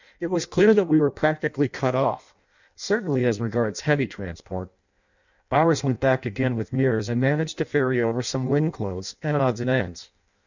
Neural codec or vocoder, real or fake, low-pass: codec, 16 kHz in and 24 kHz out, 0.6 kbps, FireRedTTS-2 codec; fake; 7.2 kHz